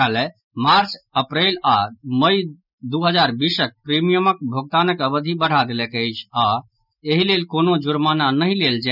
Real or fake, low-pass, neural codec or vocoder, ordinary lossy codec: real; 5.4 kHz; none; none